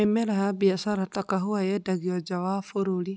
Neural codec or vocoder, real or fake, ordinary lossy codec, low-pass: none; real; none; none